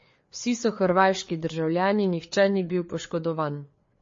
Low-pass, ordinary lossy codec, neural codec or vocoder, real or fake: 7.2 kHz; MP3, 32 kbps; codec, 16 kHz, 4 kbps, FreqCodec, larger model; fake